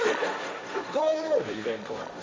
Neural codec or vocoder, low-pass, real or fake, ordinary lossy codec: codec, 16 kHz, 1.1 kbps, Voila-Tokenizer; none; fake; none